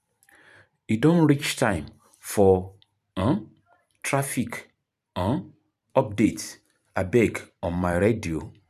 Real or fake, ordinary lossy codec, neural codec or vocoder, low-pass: fake; none; vocoder, 44.1 kHz, 128 mel bands every 512 samples, BigVGAN v2; 14.4 kHz